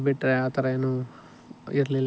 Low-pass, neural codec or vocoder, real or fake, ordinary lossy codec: none; none; real; none